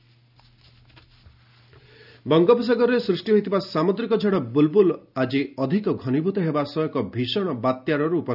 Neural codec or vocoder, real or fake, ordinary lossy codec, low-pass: none; real; none; 5.4 kHz